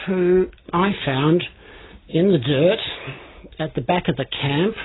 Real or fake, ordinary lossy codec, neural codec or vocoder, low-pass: real; AAC, 16 kbps; none; 7.2 kHz